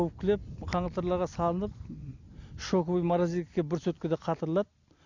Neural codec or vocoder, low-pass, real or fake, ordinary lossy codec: none; 7.2 kHz; real; none